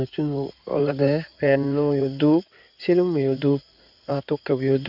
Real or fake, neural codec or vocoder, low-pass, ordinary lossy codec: fake; codec, 16 kHz in and 24 kHz out, 2.2 kbps, FireRedTTS-2 codec; 5.4 kHz; none